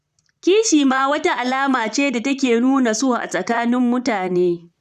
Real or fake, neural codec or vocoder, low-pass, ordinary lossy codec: fake; vocoder, 44.1 kHz, 128 mel bands, Pupu-Vocoder; 14.4 kHz; none